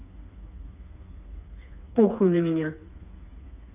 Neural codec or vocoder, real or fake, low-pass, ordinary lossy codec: codec, 16 kHz, 4 kbps, FreqCodec, smaller model; fake; 3.6 kHz; none